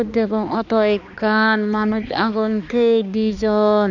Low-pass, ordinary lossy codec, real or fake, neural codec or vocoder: 7.2 kHz; none; fake; codec, 16 kHz, 2 kbps, X-Codec, HuBERT features, trained on balanced general audio